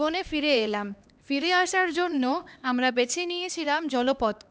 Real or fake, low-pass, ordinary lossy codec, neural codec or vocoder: fake; none; none; codec, 16 kHz, 2 kbps, X-Codec, HuBERT features, trained on LibriSpeech